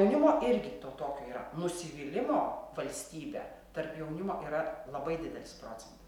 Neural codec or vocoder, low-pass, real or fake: none; 19.8 kHz; real